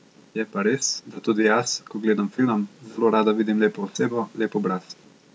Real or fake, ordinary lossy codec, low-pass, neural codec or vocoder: real; none; none; none